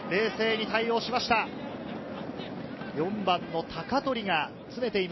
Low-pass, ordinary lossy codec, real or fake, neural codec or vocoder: 7.2 kHz; MP3, 24 kbps; real; none